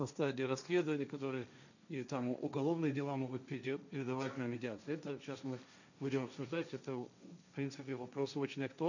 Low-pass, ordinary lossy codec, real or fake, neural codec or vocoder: 7.2 kHz; none; fake; codec, 16 kHz, 1.1 kbps, Voila-Tokenizer